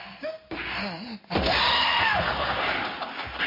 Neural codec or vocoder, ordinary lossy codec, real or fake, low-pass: codec, 16 kHz in and 24 kHz out, 1 kbps, XY-Tokenizer; MP3, 24 kbps; fake; 5.4 kHz